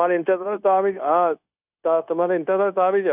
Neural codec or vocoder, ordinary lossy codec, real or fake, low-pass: codec, 16 kHz, 0.9 kbps, LongCat-Audio-Codec; none; fake; 3.6 kHz